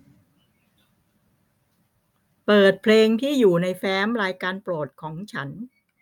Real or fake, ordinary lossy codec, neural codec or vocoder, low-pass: real; none; none; 19.8 kHz